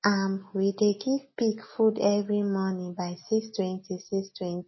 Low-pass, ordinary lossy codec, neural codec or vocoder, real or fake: 7.2 kHz; MP3, 24 kbps; none; real